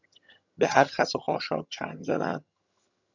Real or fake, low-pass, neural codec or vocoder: fake; 7.2 kHz; vocoder, 22.05 kHz, 80 mel bands, HiFi-GAN